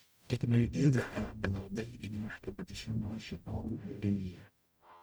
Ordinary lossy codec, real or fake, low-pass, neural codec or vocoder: none; fake; none; codec, 44.1 kHz, 0.9 kbps, DAC